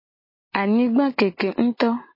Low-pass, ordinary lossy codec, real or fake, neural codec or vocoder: 5.4 kHz; MP3, 24 kbps; real; none